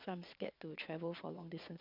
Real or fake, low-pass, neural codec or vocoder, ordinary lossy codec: fake; 5.4 kHz; vocoder, 22.05 kHz, 80 mel bands, Vocos; MP3, 48 kbps